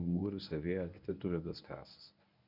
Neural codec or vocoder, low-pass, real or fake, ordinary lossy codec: codec, 24 kHz, 0.9 kbps, WavTokenizer, medium speech release version 1; 5.4 kHz; fake; none